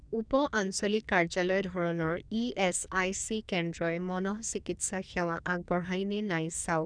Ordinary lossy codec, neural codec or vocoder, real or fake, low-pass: none; codec, 44.1 kHz, 2.6 kbps, SNAC; fake; 9.9 kHz